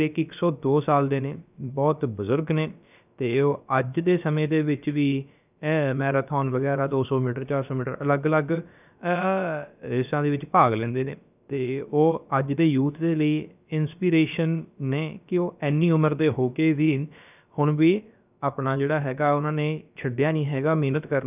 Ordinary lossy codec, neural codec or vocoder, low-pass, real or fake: none; codec, 16 kHz, about 1 kbps, DyCAST, with the encoder's durations; 3.6 kHz; fake